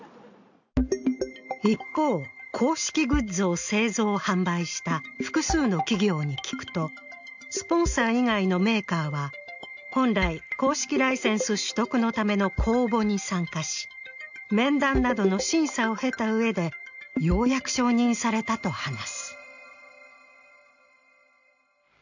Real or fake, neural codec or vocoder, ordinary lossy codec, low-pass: real; none; none; 7.2 kHz